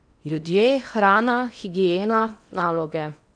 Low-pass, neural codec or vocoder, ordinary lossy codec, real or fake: 9.9 kHz; codec, 16 kHz in and 24 kHz out, 0.8 kbps, FocalCodec, streaming, 65536 codes; none; fake